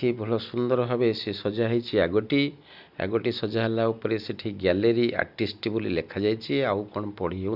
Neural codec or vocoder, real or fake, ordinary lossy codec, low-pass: none; real; Opus, 64 kbps; 5.4 kHz